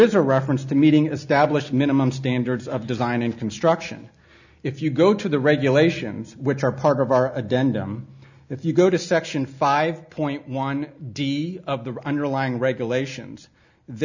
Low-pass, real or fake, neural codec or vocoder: 7.2 kHz; real; none